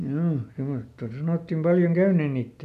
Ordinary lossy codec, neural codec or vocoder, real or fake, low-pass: none; none; real; 14.4 kHz